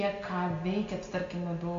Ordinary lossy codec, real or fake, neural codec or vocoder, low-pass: MP3, 48 kbps; real; none; 7.2 kHz